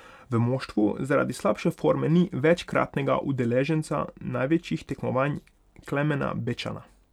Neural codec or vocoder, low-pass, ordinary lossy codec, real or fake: none; 19.8 kHz; none; real